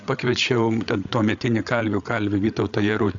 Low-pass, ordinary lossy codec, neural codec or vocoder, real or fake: 7.2 kHz; AAC, 64 kbps; codec, 16 kHz, 8 kbps, FreqCodec, larger model; fake